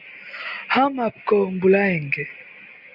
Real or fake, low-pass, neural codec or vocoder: real; 5.4 kHz; none